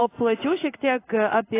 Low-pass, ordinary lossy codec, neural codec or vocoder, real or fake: 3.6 kHz; AAC, 16 kbps; codec, 16 kHz in and 24 kHz out, 1 kbps, XY-Tokenizer; fake